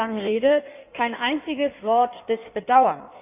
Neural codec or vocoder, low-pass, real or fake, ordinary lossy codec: codec, 16 kHz in and 24 kHz out, 1.1 kbps, FireRedTTS-2 codec; 3.6 kHz; fake; none